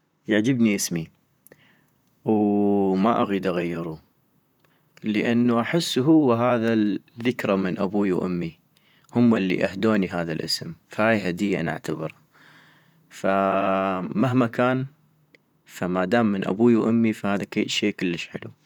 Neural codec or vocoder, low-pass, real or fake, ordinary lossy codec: vocoder, 44.1 kHz, 128 mel bands, Pupu-Vocoder; 19.8 kHz; fake; none